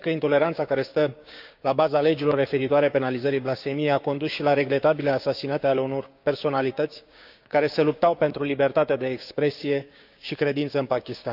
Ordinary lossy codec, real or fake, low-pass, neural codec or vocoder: none; fake; 5.4 kHz; codec, 16 kHz, 6 kbps, DAC